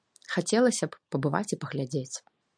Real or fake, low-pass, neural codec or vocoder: real; 9.9 kHz; none